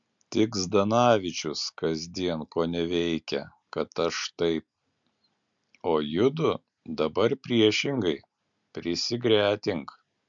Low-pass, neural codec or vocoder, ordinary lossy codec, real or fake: 7.2 kHz; none; MP3, 64 kbps; real